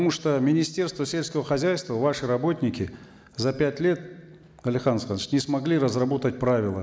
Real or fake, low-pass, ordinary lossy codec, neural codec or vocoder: real; none; none; none